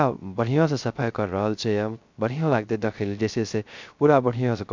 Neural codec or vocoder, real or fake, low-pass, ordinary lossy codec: codec, 16 kHz, 0.3 kbps, FocalCodec; fake; 7.2 kHz; MP3, 64 kbps